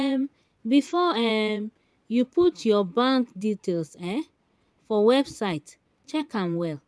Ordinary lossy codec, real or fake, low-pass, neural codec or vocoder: none; fake; none; vocoder, 22.05 kHz, 80 mel bands, Vocos